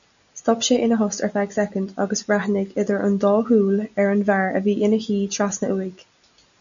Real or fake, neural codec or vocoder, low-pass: real; none; 7.2 kHz